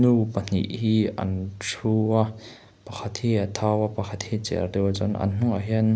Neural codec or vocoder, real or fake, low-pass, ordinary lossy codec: none; real; none; none